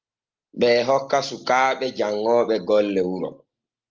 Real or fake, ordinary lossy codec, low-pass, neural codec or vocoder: real; Opus, 24 kbps; 7.2 kHz; none